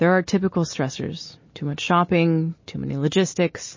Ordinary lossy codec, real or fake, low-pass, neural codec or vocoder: MP3, 32 kbps; real; 7.2 kHz; none